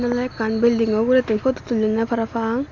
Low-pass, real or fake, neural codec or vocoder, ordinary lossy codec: 7.2 kHz; real; none; none